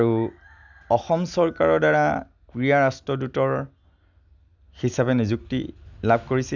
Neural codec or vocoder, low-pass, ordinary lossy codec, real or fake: none; 7.2 kHz; none; real